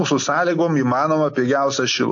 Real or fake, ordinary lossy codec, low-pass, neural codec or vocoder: real; AAC, 48 kbps; 7.2 kHz; none